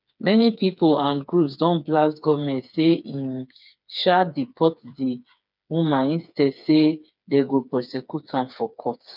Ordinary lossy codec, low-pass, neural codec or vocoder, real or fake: none; 5.4 kHz; codec, 16 kHz, 4 kbps, FreqCodec, smaller model; fake